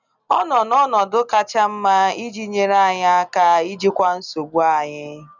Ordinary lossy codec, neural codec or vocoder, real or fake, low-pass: none; none; real; 7.2 kHz